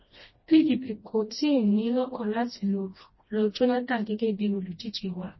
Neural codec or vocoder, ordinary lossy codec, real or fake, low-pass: codec, 16 kHz, 1 kbps, FreqCodec, smaller model; MP3, 24 kbps; fake; 7.2 kHz